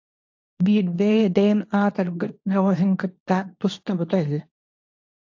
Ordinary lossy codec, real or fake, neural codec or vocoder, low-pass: AAC, 48 kbps; fake; codec, 24 kHz, 0.9 kbps, WavTokenizer, medium speech release version 2; 7.2 kHz